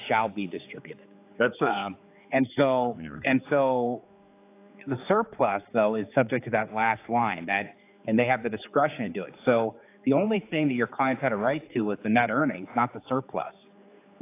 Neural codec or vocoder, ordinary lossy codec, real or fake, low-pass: codec, 16 kHz, 4 kbps, X-Codec, HuBERT features, trained on general audio; AAC, 24 kbps; fake; 3.6 kHz